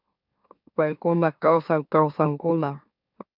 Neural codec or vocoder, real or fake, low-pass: autoencoder, 44.1 kHz, a latent of 192 numbers a frame, MeloTTS; fake; 5.4 kHz